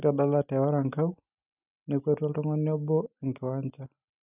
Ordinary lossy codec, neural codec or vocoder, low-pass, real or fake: none; none; 3.6 kHz; real